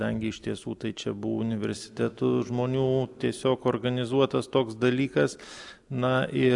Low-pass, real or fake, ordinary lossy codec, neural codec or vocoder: 10.8 kHz; real; AAC, 64 kbps; none